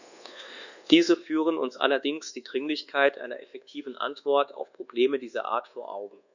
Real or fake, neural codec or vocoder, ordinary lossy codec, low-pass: fake; codec, 24 kHz, 1.2 kbps, DualCodec; none; 7.2 kHz